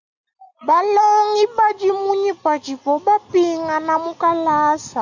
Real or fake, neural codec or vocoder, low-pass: real; none; 7.2 kHz